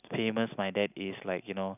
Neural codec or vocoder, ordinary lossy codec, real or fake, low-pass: none; none; real; 3.6 kHz